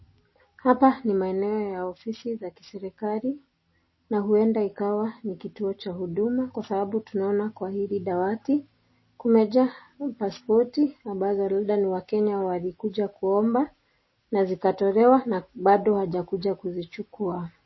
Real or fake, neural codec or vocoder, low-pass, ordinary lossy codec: real; none; 7.2 kHz; MP3, 24 kbps